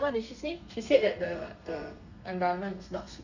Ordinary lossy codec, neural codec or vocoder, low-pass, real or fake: none; codec, 32 kHz, 1.9 kbps, SNAC; 7.2 kHz; fake